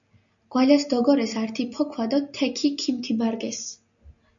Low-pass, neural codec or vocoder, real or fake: 7.2 kHz; none; real